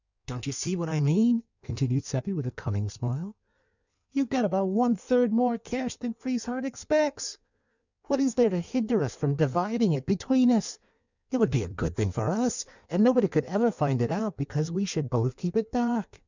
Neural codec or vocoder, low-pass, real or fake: codec, 16 kHz in and 24 kHz out, 1.1 kbps, FireRedTTS-2 codec; 7.2 kHz; fake